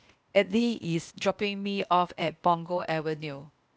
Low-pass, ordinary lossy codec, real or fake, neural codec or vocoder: none; none; fake; codec, 16 kHz, 0.8 kbps, ZipCodec